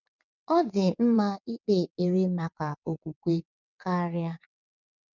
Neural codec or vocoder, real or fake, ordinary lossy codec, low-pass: vocoder, 22.05 kHz, 80 mel bands, WaveNeXt; fake; none; 7.2 kHz